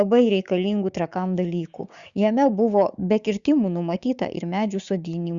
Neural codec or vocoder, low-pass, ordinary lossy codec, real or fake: codec, 16 kHz, 6 kbps, DAC; 7.2 kHz; Opus, 64 kbps; fake